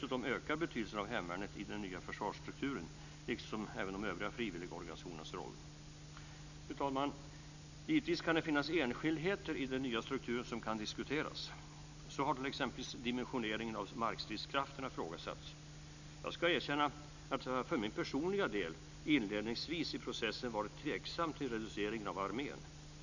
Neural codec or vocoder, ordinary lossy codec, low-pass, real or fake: none; none; 7.2 kHz; real